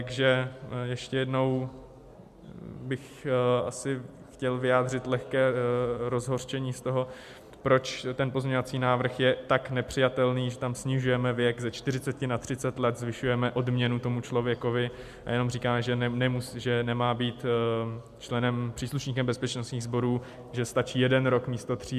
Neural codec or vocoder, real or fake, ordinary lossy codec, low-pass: none; real; MP3, 96 kbps; 14.4 kHz